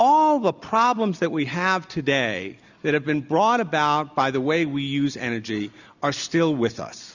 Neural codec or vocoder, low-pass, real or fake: none; 7.2 kHz; real